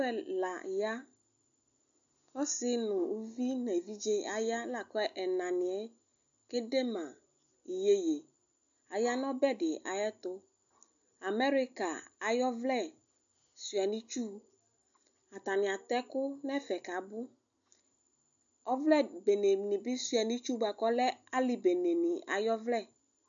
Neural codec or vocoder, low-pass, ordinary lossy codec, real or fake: none; 7.2 kHz; MP3, 64 kbps; real